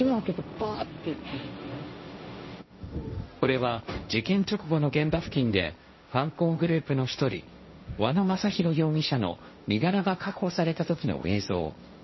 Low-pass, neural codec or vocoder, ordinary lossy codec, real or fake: 7.2 kHz; codec, 16 kHz, 1.1 kbps, Voila-Tokenizer; MP3, 24 kbps; fake